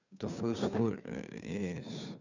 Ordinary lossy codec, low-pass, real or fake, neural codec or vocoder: none; 7.2 kHz; fake; codec, 16 kHz, 2 kbps, FunCodec, trained on Chinese and English, 25 frames a second